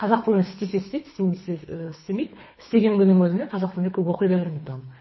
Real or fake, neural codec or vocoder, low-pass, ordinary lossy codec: fake; codec, 24 kHz, 3 kbps, HILCodec; 7.2 kHz; MP3, 24 kbps